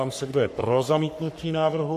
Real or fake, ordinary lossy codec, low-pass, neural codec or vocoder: fake; MP3, 64 kbps; 14.4 kHz; codec, 44.1 kHz, 3.4 kbps, Pupu-Codec